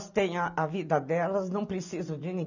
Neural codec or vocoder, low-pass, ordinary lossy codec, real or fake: none; 7.2 kHz; none; real